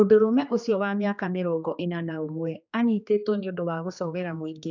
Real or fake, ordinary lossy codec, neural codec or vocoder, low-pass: fake; none; codec, 16 kHz, 2 kbps, X-Codec, HuBERT features, trained on general audio; 7.2 kHz